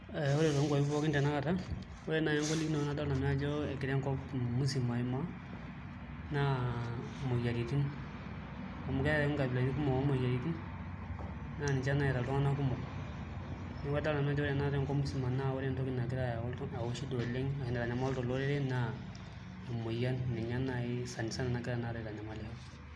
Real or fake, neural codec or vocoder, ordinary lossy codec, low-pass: real; none; none; none